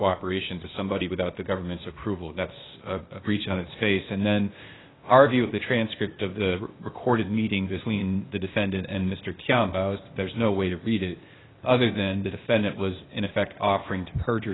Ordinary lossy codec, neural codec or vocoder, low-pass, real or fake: AAC, 16 kbps; codec, 16 kHz, 0.8 kbps, ZipCodec; 7.2 kHz; fake